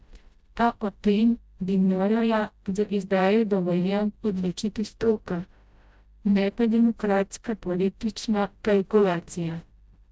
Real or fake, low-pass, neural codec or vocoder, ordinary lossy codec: fake; none; codec, 16 kHz, 0.5 kbps, FreqCodec, smaller model; none